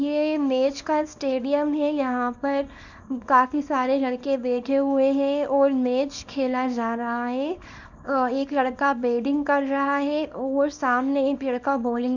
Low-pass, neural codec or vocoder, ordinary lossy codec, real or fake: 7.2 kHz; codec, 24 kHz, 0.9 kbps, WavTokenizer, small release; none; fake